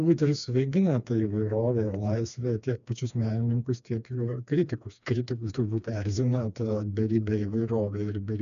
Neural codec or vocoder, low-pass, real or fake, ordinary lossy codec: codec, 16 kHz, 2 kbps, FreqCodec, smaller model; 7.2 kHz; fake; MP3, 96 kbps